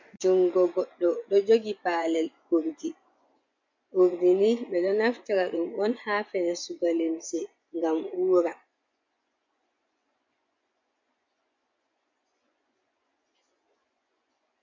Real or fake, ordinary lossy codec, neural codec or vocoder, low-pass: fake; MP3, 64 kbps; vocoder, 24 kHz, 100 mel bands, Vocos; 7.2 kHz